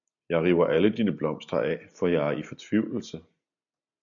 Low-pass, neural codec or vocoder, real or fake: 7.2 kHz; none; real